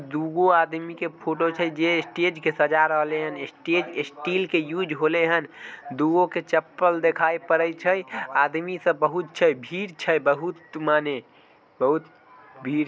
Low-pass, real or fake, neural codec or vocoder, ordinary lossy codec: none; real; none; none